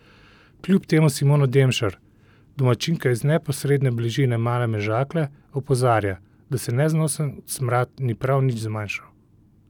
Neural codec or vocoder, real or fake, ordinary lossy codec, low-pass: none; real; none; 19.8 kHz